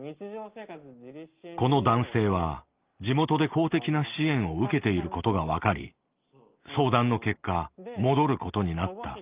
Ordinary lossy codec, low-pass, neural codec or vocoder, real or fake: Opus, 32 kbps; 3.6 kHz; none; real